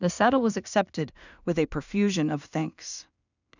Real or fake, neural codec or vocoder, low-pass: fake; codec, 16 kHz in and 24 kHz out, 0.4 kbps, LongCat-Audio-Codec, two codebook decoder; 7.2 kHz